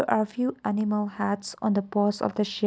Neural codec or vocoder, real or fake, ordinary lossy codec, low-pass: none; real; none; none